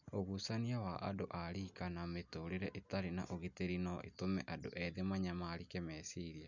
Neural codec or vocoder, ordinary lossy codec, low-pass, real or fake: none; none; 7.2 kHz; real